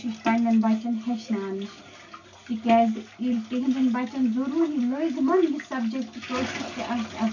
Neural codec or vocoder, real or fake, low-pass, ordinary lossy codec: none; real; 7.2 kHz; none